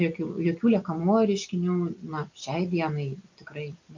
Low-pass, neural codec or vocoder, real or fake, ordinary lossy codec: 7.2 kHz; none; real; MP3, 64 kbps